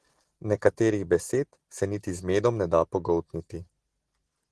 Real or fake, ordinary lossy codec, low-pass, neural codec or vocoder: real; Opus, 16 kbps; 10.8 kHz; none